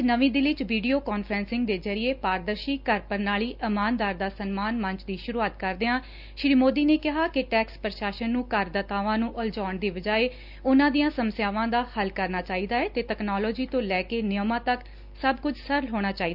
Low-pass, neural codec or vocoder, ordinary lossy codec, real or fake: 5.4 kHz; none; Opus, 64 kbps; real